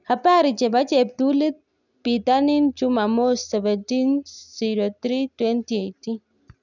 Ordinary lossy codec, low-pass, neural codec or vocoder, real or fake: none; 7.2 kHz; none; real